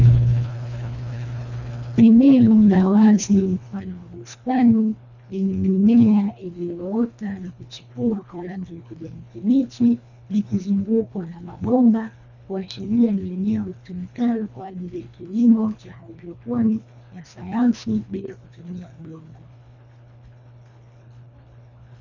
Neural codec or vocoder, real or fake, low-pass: codec, 24 kHz, 1.5 kbps, HILCodec; fake; 7.2 kHz